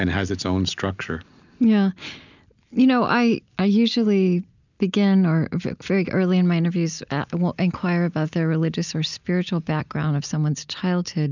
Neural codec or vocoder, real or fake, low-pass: none; real; 7.2 kHz